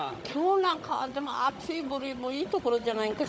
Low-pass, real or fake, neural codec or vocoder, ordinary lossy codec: none; fake; codec, 16 kHz, 16 kbps, FunCodec, trained on Chinese and English, 50 frames a second; none